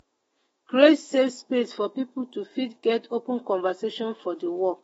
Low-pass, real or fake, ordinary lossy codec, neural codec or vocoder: 19.8 kHz; fake; AAC, 24 kbps; autoencoder, 48 kHz, 128 numbers a frame, DAC-VAE, trained on Japanese speech